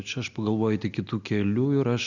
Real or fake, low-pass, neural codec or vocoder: real; 7.2 kHz; none